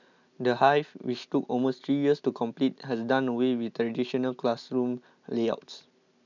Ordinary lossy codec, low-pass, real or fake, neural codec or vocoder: none; 7.2 kHz; real; none